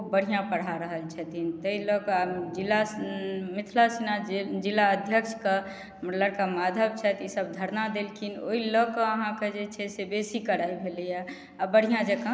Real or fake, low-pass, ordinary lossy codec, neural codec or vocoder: real; none; none; none